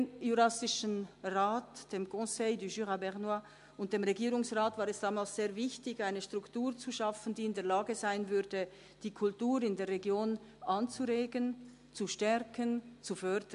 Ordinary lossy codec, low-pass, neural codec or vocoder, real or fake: MP3, 64 kbps; 10.8 kHz; none; real